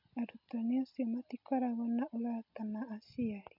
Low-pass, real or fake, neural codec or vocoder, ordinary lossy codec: 5.4 kHz; real; none; MP3, 48 kbps